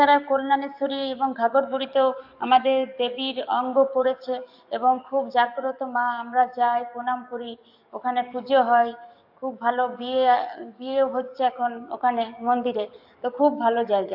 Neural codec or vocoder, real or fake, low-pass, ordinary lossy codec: codec, 44.1 kHz, 7.8 kbps, DAC; fake; 5.4 kHz; AAC, 48 kbps